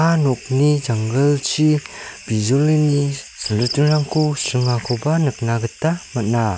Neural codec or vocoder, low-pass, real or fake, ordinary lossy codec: none; none; real; none